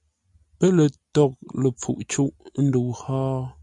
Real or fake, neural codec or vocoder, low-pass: real; none; 10.8 kHz